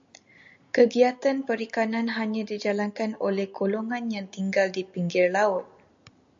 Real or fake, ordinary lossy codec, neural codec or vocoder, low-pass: real; AAC, 64 kbps; none; 7.2 kHz